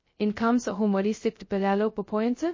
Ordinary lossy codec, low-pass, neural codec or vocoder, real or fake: MP3, 32 kbps; 7.2 kHz; codec, 16 kHz, 0.2 kbps, FocalCodec; fake